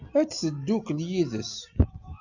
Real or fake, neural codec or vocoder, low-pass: real; none; 7.2 kHz